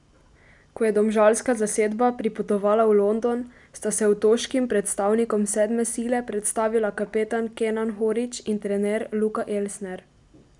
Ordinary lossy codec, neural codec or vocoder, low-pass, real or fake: none; none; 10.8 kHz; real